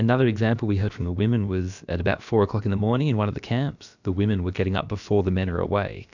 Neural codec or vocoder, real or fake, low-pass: codec, 16 kHz, about 1 kbps, DyCAST, with the encoder's durations; fake; 7.2 kHz